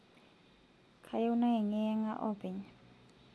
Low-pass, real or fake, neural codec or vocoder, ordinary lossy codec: none; real; none; none